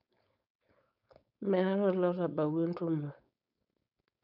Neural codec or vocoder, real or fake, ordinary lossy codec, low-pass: codec, 16 kHz, 4.8 kbps, FACodec; fake; none; 5.4 kHz